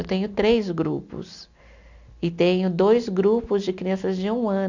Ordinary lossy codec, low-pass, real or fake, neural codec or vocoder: none; 7.2 kHz; real; none